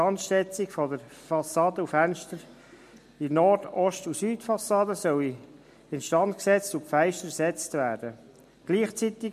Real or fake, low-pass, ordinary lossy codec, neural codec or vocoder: real; 14.4 kHz; MP3, 64 kbps; none